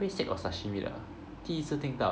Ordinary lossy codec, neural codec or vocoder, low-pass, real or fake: none; none; none; real